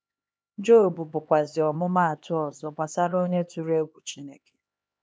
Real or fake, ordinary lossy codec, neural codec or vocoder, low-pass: fake; none; codec, 16 kHz, 2 kbps, X-Codec, HuBERT features, trained on LibriSpeech; none